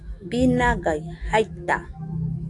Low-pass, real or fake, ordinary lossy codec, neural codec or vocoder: 10.8 kHz; fake; AAC, 48 kbps; autoencoder, 48 kHz, 128 numbers a frame, DAC-VAE, trained on Japanese speech